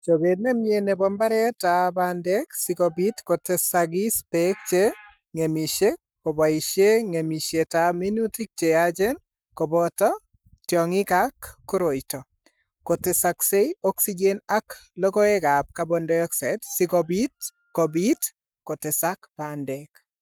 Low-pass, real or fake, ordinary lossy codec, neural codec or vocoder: none; fake; none; codec, 44.1 kHz, 7.8 kbps, Pupu-Codec